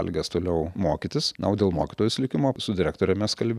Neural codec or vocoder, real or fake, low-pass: none; real; 14.4 kHz